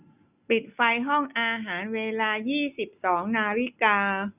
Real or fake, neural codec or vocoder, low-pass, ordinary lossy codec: real; none; 3.6 kHz; none